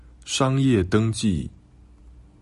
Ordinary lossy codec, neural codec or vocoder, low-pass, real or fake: MP3, 48 kbps; none; 14.4 kHz; real